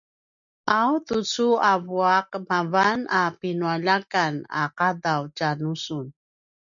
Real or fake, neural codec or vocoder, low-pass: real; none; 7.2 kHz